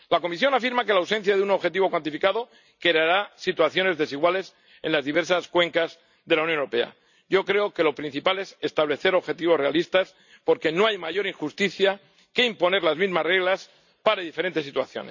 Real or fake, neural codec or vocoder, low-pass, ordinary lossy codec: real; none; 7.2 kHz; none